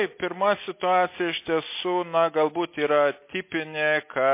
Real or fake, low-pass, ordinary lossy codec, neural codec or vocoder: real; 3.6 kHz; MP3, 24 kbps; none